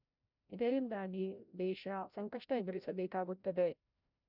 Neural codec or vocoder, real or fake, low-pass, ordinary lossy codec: codec, 16 kHz, 0.5 kbps, FreqCodec, larger model; fake; 5.4 kHz; none